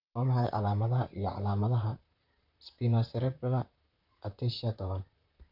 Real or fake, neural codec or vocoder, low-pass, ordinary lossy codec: fake; vocoder, 44.1 kHz, 128 mel bands, Pupu-Vocoder; 5.4 kHz; none